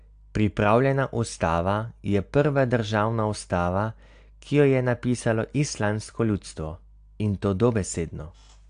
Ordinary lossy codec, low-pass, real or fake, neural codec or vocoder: AAC, 64 kbps; 9.9 kHz; real; none